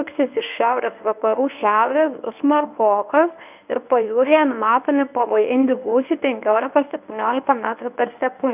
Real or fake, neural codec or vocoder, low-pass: fake; codec, 24 kHz, 0.9 kbps, WavTokenizer, medium speech release version 1; 3.6 kHz